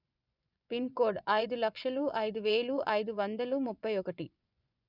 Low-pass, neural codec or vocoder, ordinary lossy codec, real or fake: 5.4 kHz; vocoder, 24 kHz, 100 mel bands, Vocos; none; fake